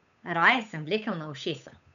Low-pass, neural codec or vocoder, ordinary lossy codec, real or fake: 7.2 kHz; codec, 16 kHz, 8 kbps, FunCodec, trained on Chinese and English, 25 frames a second; none; fake